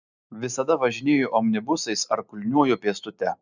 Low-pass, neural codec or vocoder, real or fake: 7.2 kHz; none; real